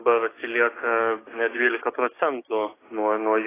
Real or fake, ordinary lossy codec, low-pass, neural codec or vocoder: fake; AAC, 16 kbps; 3.6 kHz; codec, 16 kHz, 6 kbps, DAC